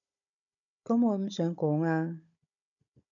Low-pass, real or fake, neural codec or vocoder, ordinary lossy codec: 7.2 kHz; fake; codec, 16 kHz, 16 kbps, FunCodec, trained on Chinese and English, 50 frames a second; MP3, 96 kbps